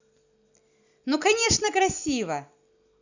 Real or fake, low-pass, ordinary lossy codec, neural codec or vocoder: real; 7.2 kHz; none; none